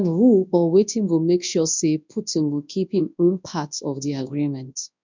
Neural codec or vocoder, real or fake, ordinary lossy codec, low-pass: codec, 24 kHz, 0.9 kbps, WavTokenizer, large speech release; fake; MP3, 64 kbps; 7.2 kHz